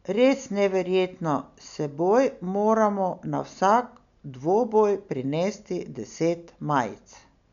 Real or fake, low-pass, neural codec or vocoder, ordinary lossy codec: real; 7.2 kHz; none; none